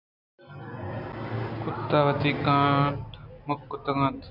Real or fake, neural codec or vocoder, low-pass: real; none; 5.4 kHz